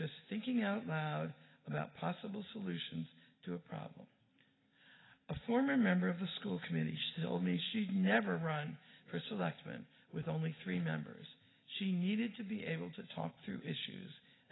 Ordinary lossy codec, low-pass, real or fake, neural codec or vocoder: AAC, 16 kbps; 7.2 kHz; real; none